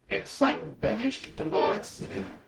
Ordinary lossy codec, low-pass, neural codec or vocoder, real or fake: Opus, 24 kbps; 19.8 kHz; codec, 44.1 kHz, 0.9 kbps, DAC; fake